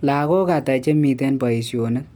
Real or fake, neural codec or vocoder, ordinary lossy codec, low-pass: real; none; none; none